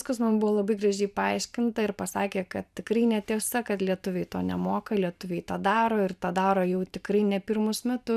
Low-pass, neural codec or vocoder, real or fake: 14.4 kHz; vocoder, 44.1 kHz, 128 mel bands every 512 samples, BigVGAN v2; fake